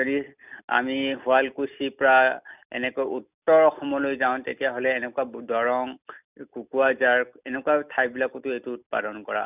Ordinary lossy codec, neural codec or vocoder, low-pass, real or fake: none; none; 3.6 kHz; real